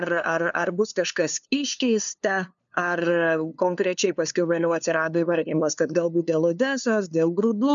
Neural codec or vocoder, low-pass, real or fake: codec, 16 kHz, 2 kbps, FunCodec, trained on LibriTTS, 25 frames a second; 7.2 kHz; fake